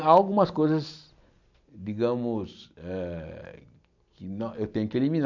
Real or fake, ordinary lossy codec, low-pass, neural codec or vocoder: real; none; 7.2 kHz; none